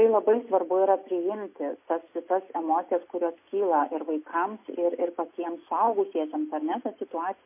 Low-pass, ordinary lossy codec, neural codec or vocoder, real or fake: 3.6 kHz; MP3, 24 kbps; none; real